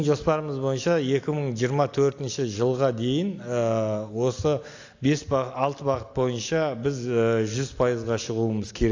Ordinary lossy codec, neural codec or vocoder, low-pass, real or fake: AAC, 48 kbps; none; 7.2 kHz; real